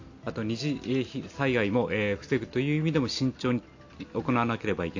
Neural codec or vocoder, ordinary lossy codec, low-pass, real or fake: none; AAC, 48 kbps; 7.2 kHz; real